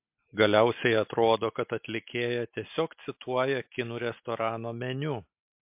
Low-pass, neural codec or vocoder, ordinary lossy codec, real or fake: 3.6 kHz; none; MP3, 32 kbps; real